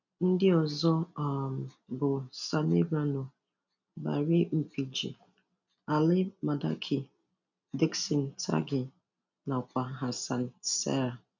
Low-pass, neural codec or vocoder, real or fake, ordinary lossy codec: 7.2 kHz; none; real; none